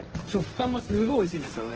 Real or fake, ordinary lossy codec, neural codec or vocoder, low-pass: fake; Opus, 16 kbps; codec, 16 kHz, 1.1 kbps, Voila-Tokenizer; 7.2 kHz